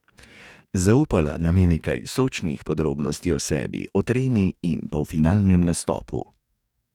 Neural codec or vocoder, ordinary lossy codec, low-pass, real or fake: codec, 44.1 kHz, 2.6 kbps, DAC; none; 19.8 kHz; fake